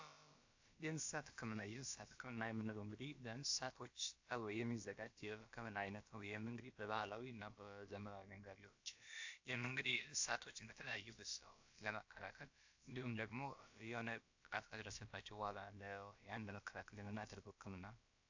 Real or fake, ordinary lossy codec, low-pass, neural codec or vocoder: fake; AAC, 48 kbps; 7.2 kHz; codec, 16 kHz, about 1 kbps, DyCAST, with the encoder's durations